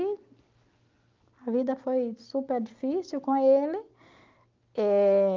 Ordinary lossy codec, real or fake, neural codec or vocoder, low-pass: Opus, 24 kbps; real; none; 7.2 kHz